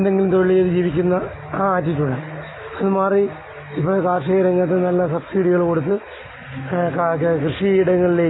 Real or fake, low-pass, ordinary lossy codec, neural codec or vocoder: real; 7.2 kHz; AAC, 16 kbps; none